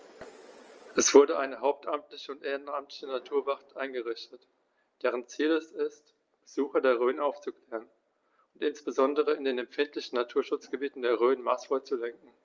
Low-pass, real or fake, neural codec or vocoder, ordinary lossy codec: 7.2 kHz; real; none; Opus, 24 kbps